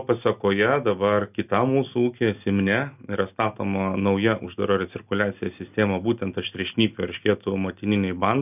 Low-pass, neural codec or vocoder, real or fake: 3.6 kHz; none; real